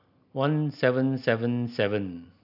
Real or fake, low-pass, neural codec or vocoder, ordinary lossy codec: real; 5.4 kHz; none; none